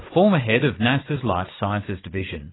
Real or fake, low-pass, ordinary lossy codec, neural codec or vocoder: fake; 7.2 kHz; AAC, 16 kbps; vocoder, 44.1 kHz, 80 mel bands, Vocos